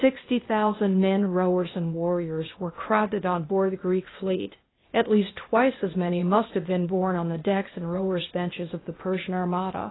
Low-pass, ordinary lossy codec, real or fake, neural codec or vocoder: 7.2 kHz; AAC, 16 kbps; fake; codec, 16 kHz, 0.8 kbps, ZipCodec